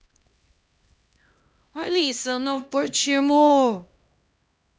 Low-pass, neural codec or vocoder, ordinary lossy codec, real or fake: none; codec, 16 kHz, 1 kbps, X-Codec, HuBERT features, trained on LibriSpeech; none; fake